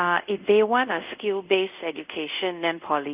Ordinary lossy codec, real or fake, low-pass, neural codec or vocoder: Opus, 24 kbps; fake; 3.6 kHz; codec, 24 kHz, 0.5 kbps, DualCodec